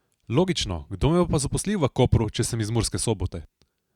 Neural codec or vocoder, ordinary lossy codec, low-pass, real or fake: none; Opus, 64 kbps; 19.8 kHz; real